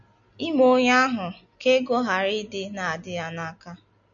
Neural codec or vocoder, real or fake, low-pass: none; real; 7.2 kHz